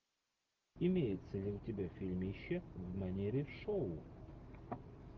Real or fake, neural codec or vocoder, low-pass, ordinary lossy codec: real; none; 7.2 kHz; Opus, 32 kbps